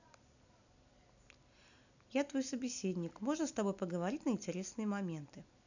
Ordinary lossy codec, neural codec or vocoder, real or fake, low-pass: none; none; real; 7.2 kHz